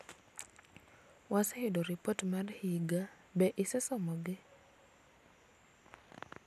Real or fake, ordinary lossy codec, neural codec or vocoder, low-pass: real; none; none; 14.4 kHz